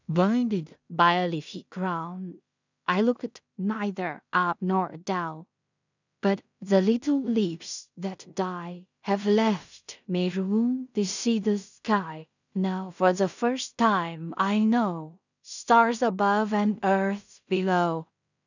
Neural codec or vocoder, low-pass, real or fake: codec, 16 kHz in and 24 kHz out, 0.4 kbps, LongCat-Audio-Codec, two codebook decoder; 7.2 kHz; fake